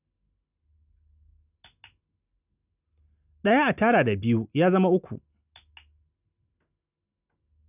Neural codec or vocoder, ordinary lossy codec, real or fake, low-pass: none; none; real; 3.6 kHz